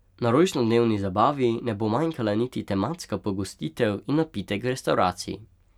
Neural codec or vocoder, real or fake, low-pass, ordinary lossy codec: none; real; 19.8 kHz; none